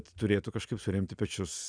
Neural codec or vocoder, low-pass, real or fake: none; 9.9 kHz; real